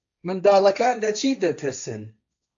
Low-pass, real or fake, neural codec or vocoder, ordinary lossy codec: 7.2 kHz; fake; codec, 16 kHz, 1.1 kbps, Voila-Tokenizer; AAC, 64 kbps